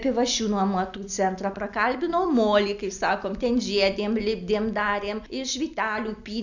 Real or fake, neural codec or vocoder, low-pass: real; none; 7.2 kHz